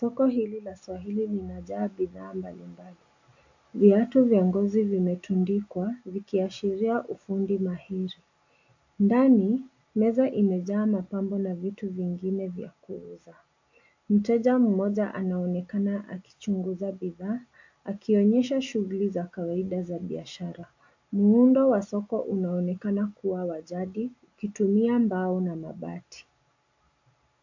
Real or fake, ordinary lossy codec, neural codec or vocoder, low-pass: real; AAC, 48 kbps; none; 7.2 kHz